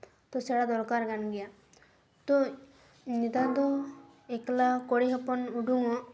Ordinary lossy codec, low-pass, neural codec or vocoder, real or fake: none; none; none; real